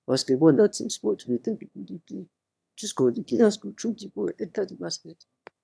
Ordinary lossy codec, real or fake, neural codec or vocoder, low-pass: none; fake; autoencoder, 22.05 kHz, a latent of 192 numbers a frame, VITS, trained on one speaker; none